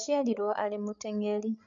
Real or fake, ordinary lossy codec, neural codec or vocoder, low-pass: fake; none; codec, 16 kHz, 8 kbps, FreqCodec, larger model; 7.2 kHz